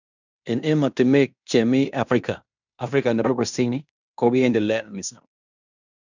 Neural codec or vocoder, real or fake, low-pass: codec, 16 kHz in and 24 kHz out, 0.9 kbps, LongCat-Audio-Codec, fine tuned four codebook decoder; fake; 7.2 kHz